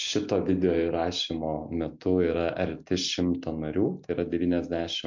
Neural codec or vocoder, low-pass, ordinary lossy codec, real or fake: none; 7.2 kHz; MP3, 48 kbps; real